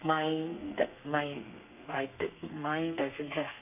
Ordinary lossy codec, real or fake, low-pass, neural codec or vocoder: none; fake; 3.6 kHz; codec, 32 kHz, 1.9 kbps, SNAC